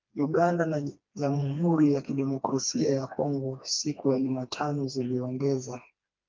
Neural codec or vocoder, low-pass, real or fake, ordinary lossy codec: codec, 16 kHz, 2 kbps, FreqCodec, smaller model; 7.2 kHz; fake; Opus, 32 kbps